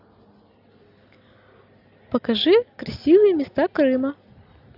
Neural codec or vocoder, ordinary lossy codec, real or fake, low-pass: none; AAC, 48 kbps; real; 5.4 kHz